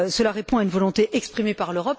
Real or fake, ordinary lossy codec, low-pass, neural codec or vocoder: real; none; none; none